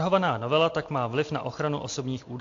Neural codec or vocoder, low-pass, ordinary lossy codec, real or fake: none; 7.2 kHz; AAC, 48 kbps; real